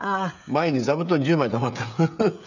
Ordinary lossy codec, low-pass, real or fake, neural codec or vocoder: none; 7.2 kHz; fake; codec, 16 kHz, 16 kbps, FreqCodec, smaller model